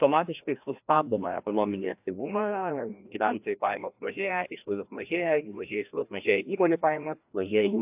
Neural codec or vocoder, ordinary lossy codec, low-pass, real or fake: codec, 16 kHz, 1 kbps, FreqCodec, larger model; AAC, 32 kbps; 3.6 kHz; fake